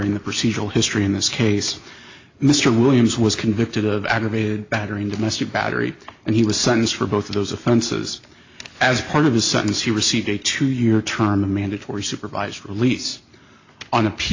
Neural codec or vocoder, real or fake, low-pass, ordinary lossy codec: none; real; 7.2 kHz; AAC, 48 kbps